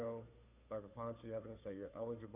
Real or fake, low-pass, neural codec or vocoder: fake; 3.6 kHz; codec, 16 kHz, 2 kbps, FunCodec, trained on Chinese and English, 25 frames a second